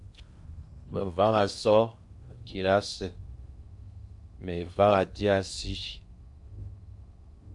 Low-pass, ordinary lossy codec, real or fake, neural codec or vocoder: 10.8 kHz; MP3, 64 kbps; fake; codec, 16 kHz in and 24 kHz out, 0.8 kbps, FocalCodec, streaming, 65536 codes